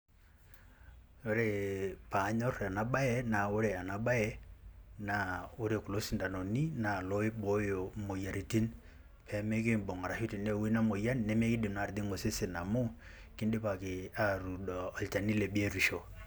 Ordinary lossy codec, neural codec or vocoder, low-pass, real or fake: none; none; none; real